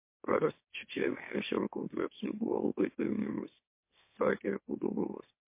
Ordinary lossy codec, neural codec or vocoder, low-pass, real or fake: MP3, 24 kbps; autoencoder, 44.1 kHz, a latent of 192 numbers a frame, MeloTTS; 3.6 kHz; fake